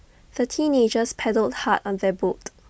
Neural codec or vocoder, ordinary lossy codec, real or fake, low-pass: none; none; real; none